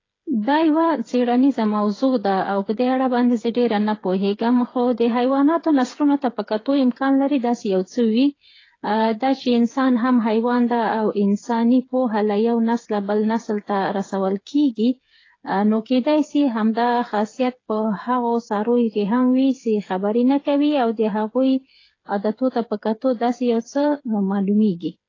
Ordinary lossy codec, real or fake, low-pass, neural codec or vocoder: AAC, 32 kbps; fake; 7.2 kHz; codec, 16 kHz, 8 kbps, FreqCodec, smaller model